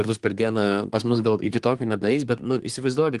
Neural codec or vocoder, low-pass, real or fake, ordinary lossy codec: codec, 24 kHz, 1 kbps, SNAC; 10.8 kHz; fake; Opus, 24 kbps